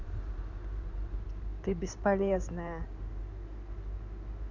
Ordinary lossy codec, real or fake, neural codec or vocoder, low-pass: none; fake; codec, 16 kHz in and 24 kHz out, 2.2 kbps, FireRedTTS-2 codec; 7.2 kHz